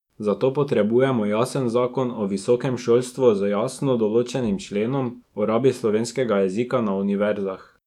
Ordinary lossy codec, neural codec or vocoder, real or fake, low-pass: none; autoencoder, 48 kHz, 128 numbers a frame, DAC-VAE, trained on Japanese speech; fake; 19.8 kHz